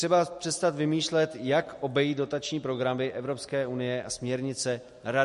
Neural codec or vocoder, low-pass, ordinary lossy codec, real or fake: none; 14.4 kHz; MP3, 48 kbps; real